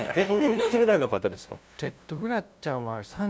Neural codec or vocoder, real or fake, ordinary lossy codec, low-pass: codec, 16 kHz, 0.5 kbps, FunCodec, trained on LibriTTS, 25 frames a second; fake; none; none